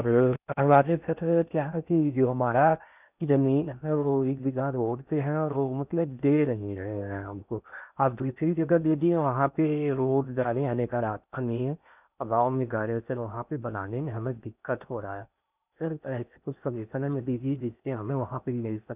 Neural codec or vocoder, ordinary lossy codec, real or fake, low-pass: codec, 16 kHz in and 24 kHz out, 0.6 kbps, FocalCodec, streaming, 4096 codes; none; fake; 3.6 kHz